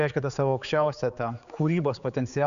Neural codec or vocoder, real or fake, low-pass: codec, 16 kHz, 4 kbps, X-Codec, HuBERT features, trained on general audio; fake; 7.2 kHz